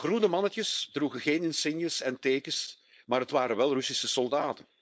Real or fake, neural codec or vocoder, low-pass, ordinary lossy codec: fake; codec, 16 kHz, 4.8 kbps, FACodec; none; none